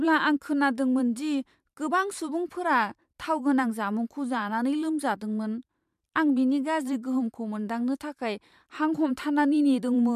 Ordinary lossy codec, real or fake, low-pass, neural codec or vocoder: MP3, 96 kbps; fake; 14.4 kHz; vocoder, 44.1 kHz, 128 mel bands every 512 samples, BigVGAN v2